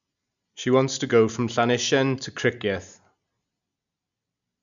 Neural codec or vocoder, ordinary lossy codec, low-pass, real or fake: none; none; 7.2 kHz; real